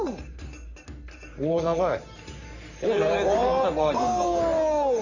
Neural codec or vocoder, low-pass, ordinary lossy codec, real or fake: codec, 44.1 kHz, 3.4 kbps, Pupu-Codec; 7.2 kHz; none; fake